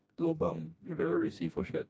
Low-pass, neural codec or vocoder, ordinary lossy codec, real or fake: none; codec, 16 kHz, 1 kbps, FreqCodec, smaller model; none; fake